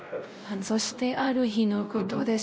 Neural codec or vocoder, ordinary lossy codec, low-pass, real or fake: codec, 16 kHz, 0.5 kbps, X-Codec, WavLM features, trained on Multilingual LibriSpeech; none; none; fake